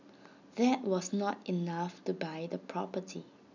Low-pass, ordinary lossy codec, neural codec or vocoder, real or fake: 7.2 kHz; none; none; real